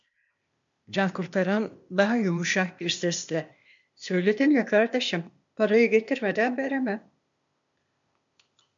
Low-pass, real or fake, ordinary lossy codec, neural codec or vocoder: 7.2 kHz; fake; MP3, 64 kbps; codec, 16 kHz, 0.8 kbps, ZipCodec